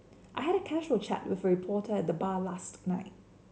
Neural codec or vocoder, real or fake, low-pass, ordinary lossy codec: none; real; none; none